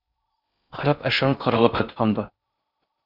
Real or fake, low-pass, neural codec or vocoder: fake; 5.4 kHz; codec, 16 kHz in and 24 kHz out, 0.6 kbps, FocalCodec, streaming, 4096 codes